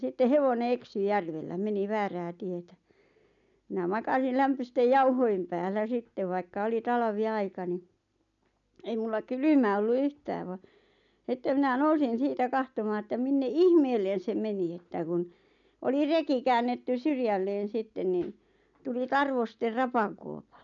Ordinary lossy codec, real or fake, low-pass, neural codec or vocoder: none; real; 7.2 kHz; none